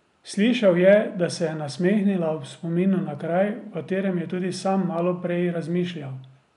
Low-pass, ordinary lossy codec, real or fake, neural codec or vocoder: 10.8 kHz; none; real; none